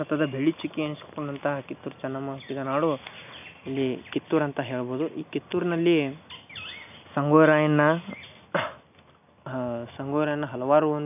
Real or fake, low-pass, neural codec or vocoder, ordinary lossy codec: real; 3.6 kHz; none; none